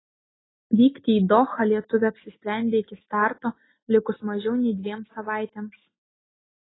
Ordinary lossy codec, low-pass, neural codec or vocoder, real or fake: AAC, 16 kbps; 7.2 kHz; none; real